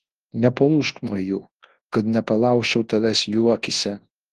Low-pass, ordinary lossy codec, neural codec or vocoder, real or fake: 10.8 kHz; Opus, 16 kbps; codec, 24 kHz, 0.9 kbps, WavTokenizer, large speech release; fake